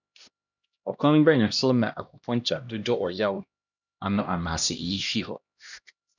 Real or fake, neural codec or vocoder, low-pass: fake; codec, 16 kHz, 1 kbps, X-Codec, HuBERT features, trained on LibriSpeech; 7.2 kHz